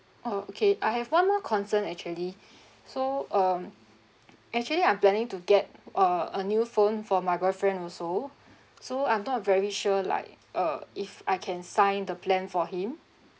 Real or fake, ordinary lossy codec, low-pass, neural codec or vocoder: real; none; none; none